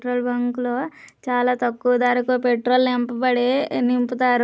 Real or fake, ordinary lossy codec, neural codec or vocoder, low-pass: real; none; none; none